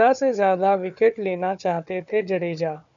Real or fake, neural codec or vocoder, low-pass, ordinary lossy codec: fake; codec, 16 kHz, 4 kbps, FunCodec, trained on Chinese and English, 50 frames a second; 7.2 kHz; MP3, 96 kbps